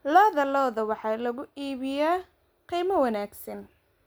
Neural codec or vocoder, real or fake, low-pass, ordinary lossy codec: none; real; none; none